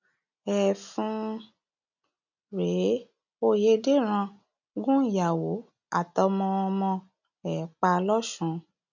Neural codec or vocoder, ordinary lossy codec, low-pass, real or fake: none; none; 7.2 kHz; real